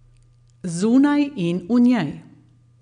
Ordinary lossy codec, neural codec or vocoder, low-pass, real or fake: none; none; 9.9 kHz; real